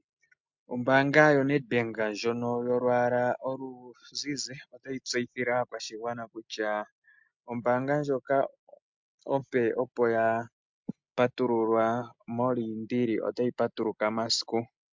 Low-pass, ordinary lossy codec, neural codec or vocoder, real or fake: 7.2 kHz; MP3, 64 kbps; none; real